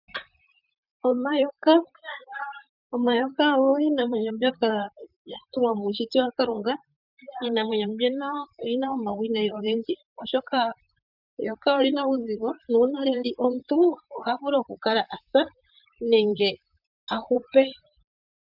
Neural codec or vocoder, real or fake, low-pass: vocoder, 44.1 kHz, 128 mel bands, Pupu-Vocoder; fake; 5.4 kHz